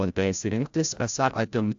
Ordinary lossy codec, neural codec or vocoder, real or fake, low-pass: AAC, 64 kbps; codec, 16 kHz, 0.5 kbps, FreqCodec, larger model; fake; 7.2 kHz